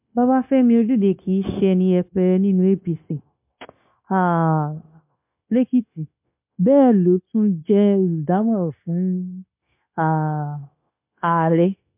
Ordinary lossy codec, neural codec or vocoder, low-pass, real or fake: MP3, 32 kbps; codec, 16 kHz, 0.9 kbps, LongCat-Audio-Codec; 3.6 kHz; fake